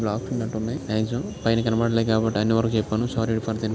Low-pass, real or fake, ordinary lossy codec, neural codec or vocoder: none; real; none; none